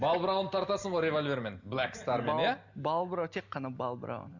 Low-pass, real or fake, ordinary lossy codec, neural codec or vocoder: 7.2 kHz; real; Opus, 64 kbps; none